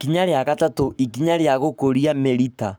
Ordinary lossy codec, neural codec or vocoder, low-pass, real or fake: none; codec, 44.1 kHz, 7.8 kbps, Pupu-Codec; none; fake